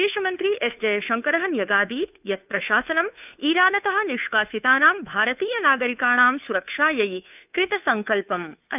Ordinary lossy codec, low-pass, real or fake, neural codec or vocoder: none; 3.6 kHz; fake; codec, 16 kHz, 2 kbps, FunCodec, trained on Chinese and English, 25 frames a second